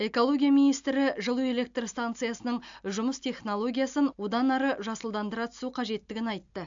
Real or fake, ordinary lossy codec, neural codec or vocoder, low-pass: real; AAC, 64 kbps; none; 7.2 kHz